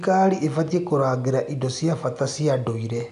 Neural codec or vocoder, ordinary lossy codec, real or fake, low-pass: none; none; real; 10.8 kHz